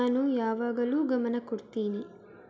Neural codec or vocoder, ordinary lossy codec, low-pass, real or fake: none; none; none; real